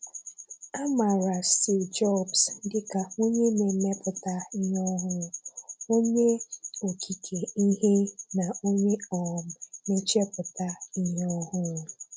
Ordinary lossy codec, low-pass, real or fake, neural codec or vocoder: none; none; real; none